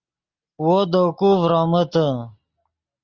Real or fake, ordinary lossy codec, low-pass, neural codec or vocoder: real; Opus, 32 kbps; 7.2 kHz; none